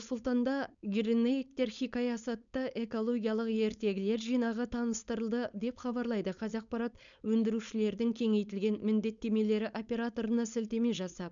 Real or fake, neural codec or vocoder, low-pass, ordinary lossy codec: fake; codec, 16 kHz, 4.8 kbps, FACodec; 7.2 kHz; MP3, 96 kbps